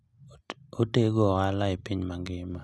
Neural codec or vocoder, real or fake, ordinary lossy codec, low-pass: none; real; none; none